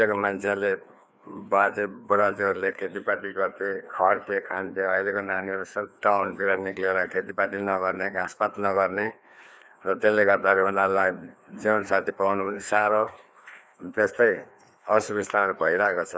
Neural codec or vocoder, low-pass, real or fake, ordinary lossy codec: codec, 16 kHz, 2 kbps, FreqCodec, larger model; none; fake; none